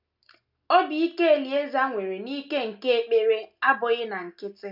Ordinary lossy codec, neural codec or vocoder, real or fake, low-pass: none; none; real; 5.4 kHz